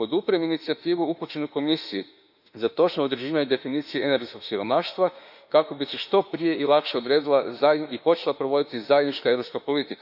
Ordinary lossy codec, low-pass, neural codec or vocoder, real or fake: none; 5.4 kHz; autoencoder, 48 kHz, 32 numbers a frame, DAC-VAE, trained on Japanese speech; fake